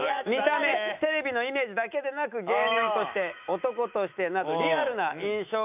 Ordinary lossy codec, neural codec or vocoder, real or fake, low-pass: none; autoencoder, 48 kHz, 128 numbers a frame, DAC-VAE, trained on Japanese speech; fake; 3.6 kHz